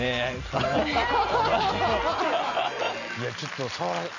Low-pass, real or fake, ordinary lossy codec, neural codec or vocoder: 7.2 kHz; real; none; none